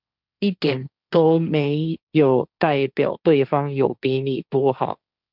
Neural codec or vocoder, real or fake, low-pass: codec, 16 kHz, 1.1 kbps, Voila-Tokenizer; fake; 5.4 kHz